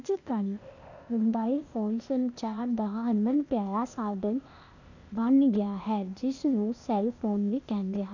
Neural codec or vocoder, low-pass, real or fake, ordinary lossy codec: codec, 16 kHz, 0.8 kbps, ZipCodec; 7.2 kHz; fake; none